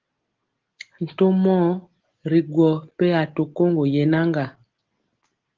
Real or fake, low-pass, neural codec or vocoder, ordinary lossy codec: real; 7.2 kHz; none; Opus, 16 kbps